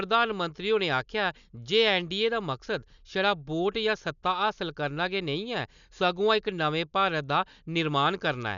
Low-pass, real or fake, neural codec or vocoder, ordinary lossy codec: 7.2 kHz; real; none; none